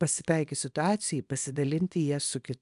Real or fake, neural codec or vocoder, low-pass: fake; codec, 24 kHz, 0.9 kbps, WavTokenizer, small release; 10.8 kHz